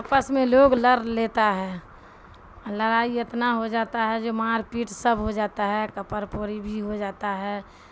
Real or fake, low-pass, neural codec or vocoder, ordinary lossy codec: real; none; none; none